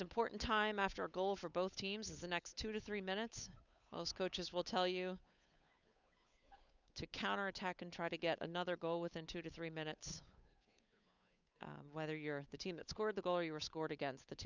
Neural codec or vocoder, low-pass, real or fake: codec, 16 kHz, 8 kbps, FunCodec, trained on Chinese and English, 25 frames a second; 7.2 kHz; fake